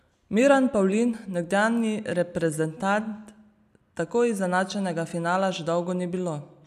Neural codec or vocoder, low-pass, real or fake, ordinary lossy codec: none; 14.4 kHz; real; none